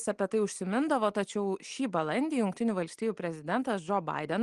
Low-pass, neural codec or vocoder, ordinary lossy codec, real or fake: 10.8 kHz; none; Opus, 24 kbps; real